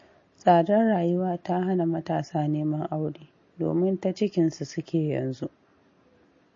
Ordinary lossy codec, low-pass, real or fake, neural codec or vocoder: MP3, 32 kbps; 7.2 kHz; real; none